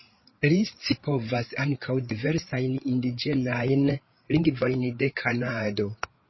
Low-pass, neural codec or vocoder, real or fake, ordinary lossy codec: 7.2 kHz; codec, 16 kHz, 8 kbps, FreqCodec, larger model; fake; MP3, 24 kbps